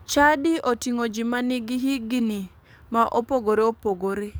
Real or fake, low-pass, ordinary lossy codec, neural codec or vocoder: fake; none; none; codec, 44.1 kHz, 7.8 kbps, DAC